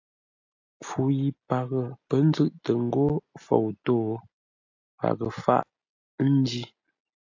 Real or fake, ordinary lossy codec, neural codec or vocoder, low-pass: real; AAC, 48 kbps; none; 7.2 kHz